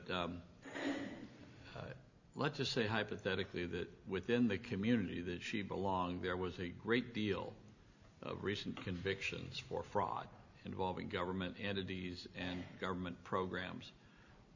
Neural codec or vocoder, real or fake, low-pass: none; real; 7.2 kHz